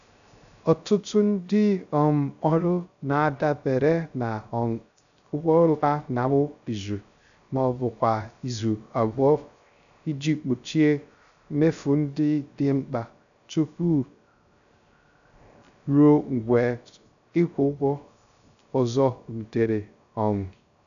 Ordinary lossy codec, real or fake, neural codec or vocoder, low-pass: none; fake; codec, 16 kHz, 0.3 kbps, FocalCodec; 7.2 kHz